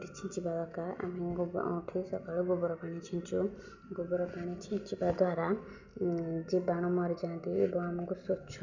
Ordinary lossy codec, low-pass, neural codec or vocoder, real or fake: none; 7.2 kHz; none; real